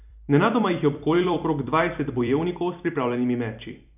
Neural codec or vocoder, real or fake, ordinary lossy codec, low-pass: vocoder, 44.1 kHz, 128 mel bands every 256 samples, BigVGAN v2; fake; none; 3.6 kHz